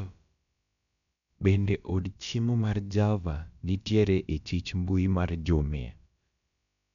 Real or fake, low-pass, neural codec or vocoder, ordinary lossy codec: fake; 7.2 kHz; codec, 16 kHz, about 1 kbps, DyCAST, with the encoder's durations; none